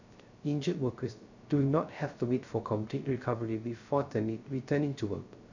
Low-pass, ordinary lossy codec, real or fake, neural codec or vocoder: 7.2 kHz; none; fake; codec, 16 kHz, 0.3 kbps, FocalCodec